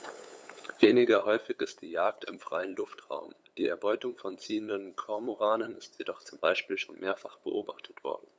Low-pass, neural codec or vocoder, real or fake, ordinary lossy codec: none; codec, 16 kHz, 8 kbps, FunCodec, trained on LibriTTS, 25 frames a second; fake; none